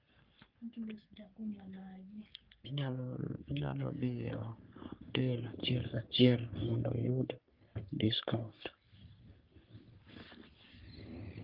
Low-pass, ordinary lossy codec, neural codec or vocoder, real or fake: 5.4 kHz; Opus, 24 kbps; codec, 44.1 kHz, 3.4 kbps, Pupu-Codec; fake